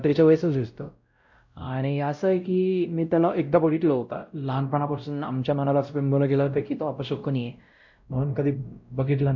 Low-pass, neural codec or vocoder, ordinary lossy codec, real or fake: 7.2 kHz; codec, 16 kHz, 0.5 kbps, X-Codec, WavLM features, trained on Multilingual LibriSpeech; MP3, 48 kbps; fake